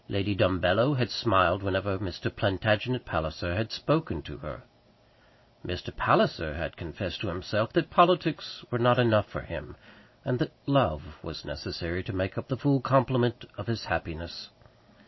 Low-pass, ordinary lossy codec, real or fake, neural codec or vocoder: 7.2 kHz; MP3, 24 kbps; real; none